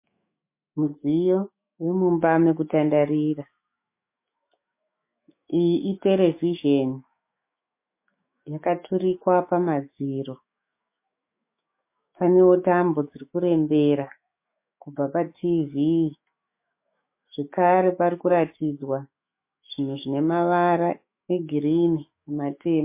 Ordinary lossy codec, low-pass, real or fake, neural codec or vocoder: MP3, 24 kbps; 3.6 kHz; fake; autoencoder, 48 kHz, 128 numbers a frame, DAC-VAE, trained on Japanese speech